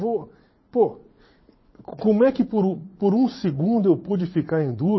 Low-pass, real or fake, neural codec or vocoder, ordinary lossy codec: 7.2 kHz; fake; vocoder, 44.1 kHz, 80 mel bands, Vocos; MP3, 24 kbps